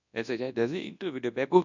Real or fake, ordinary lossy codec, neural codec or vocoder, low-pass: fake; none; codec, 24 kHz, 0.9 kbps, WavTokenizer, large speech release; 7.2 kHz